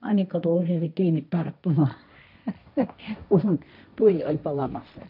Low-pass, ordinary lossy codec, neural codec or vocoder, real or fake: 5.4 kHz; none; codec, 16 kHz, 1.1 kbps, Voila-Tokenizer; fake